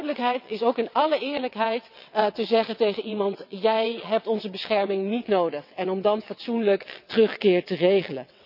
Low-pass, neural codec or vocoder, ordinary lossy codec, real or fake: 5.4 kHz; vocoder, 22.05 kHz, 80 mel bands, WaveNeXt; none; fake